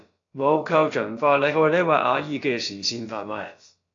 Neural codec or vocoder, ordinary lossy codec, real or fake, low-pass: codec, 16 kHz, about 1 kbps, DyCAST, with the encoder's durations; AAC, 48 kbps; fake; 7.2 kHz